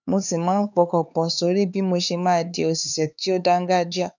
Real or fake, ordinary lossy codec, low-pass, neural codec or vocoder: fake; none; 7.2 kHz; codec, 16 kHz, 4 kbps, X-Codec, HuBERT features, trained on LibriSpeech